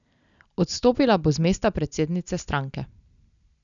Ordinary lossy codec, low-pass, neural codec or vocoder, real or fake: none; 7.2 kHz; none; real